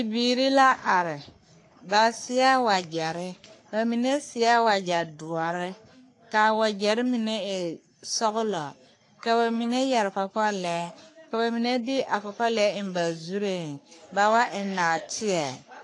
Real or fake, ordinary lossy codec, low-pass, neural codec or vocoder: fake; AAC, 48 kbps; 10.8 kHz; codec, 44.1 kHz, 3.4 kbps, Pupu-Codec